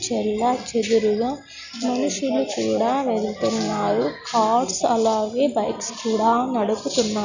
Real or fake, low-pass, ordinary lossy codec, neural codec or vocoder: real; 7.2 kHz; none; none